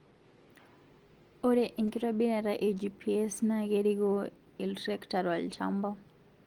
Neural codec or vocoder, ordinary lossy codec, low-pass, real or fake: none; Opus, 24 kbps; 19.8 kHz; real